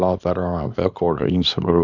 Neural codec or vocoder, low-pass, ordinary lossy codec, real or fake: codec, 24 kHz, 0.9 kbps, WavTokenizer, small release; 7.2 kHz; none; fake